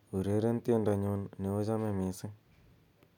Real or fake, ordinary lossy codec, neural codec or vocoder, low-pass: real; none; none; 19.8 kHz